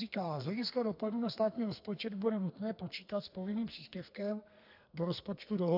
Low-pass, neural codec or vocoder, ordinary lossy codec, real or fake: 5.4 kHz; codec, 32 kHz, 1.9 kbps, SNAC; AAC, 48 kbps; fake